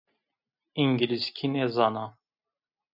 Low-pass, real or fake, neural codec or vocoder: 5.4 kHz; real; none